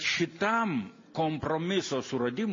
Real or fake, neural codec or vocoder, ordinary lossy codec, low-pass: real; none; MP3, 32 kbps; 7.2 kHz